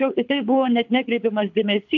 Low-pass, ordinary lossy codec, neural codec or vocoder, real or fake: 7.2 kHz; MP3, 64 kbps; codec, 24 kHz, 6 kbps, HILCodec; fake